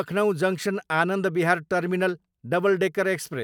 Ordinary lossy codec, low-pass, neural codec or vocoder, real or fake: none; 19.8 kHz; vocoder, 44.1 kHz, 128 mel bands every 256 samples, BigVGAN v2; fake